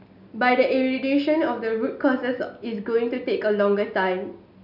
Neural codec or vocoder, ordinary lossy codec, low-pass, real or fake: none; none; 5.4 kHz; real